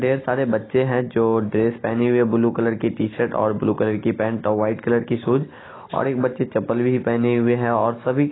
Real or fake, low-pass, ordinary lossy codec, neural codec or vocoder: real; 7.2 kHz; AAC, 16 kbps; none